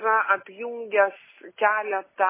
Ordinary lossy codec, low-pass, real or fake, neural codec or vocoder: MP3, 16 kbps; 3.6 kHz; real; none